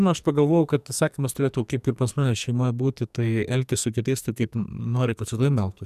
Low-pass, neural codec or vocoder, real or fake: 14.4 kHz; codec, 44.1 kHz, 2.6 kbps, SNAC; fake